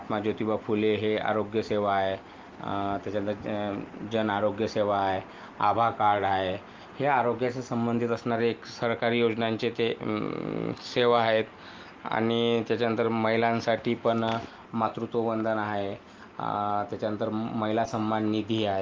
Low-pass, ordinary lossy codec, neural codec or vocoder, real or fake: 7.2 kHz; Opus, 24 kbps; none; real